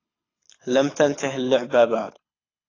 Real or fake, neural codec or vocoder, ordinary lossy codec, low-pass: fake; codec, 24 kHz, 6 kbps, HILCodec; AAC, 32 kbps; 7.2 kHz